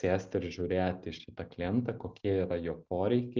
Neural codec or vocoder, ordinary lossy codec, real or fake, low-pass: none; Opus, 24 kbps; real; 7.2 kHz